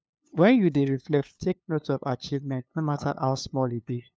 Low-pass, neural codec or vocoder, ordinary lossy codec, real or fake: none; codec, 16 kHz, 2 kbps, FunCodec, trained on LibriTTS, 25 frames a second; none; fake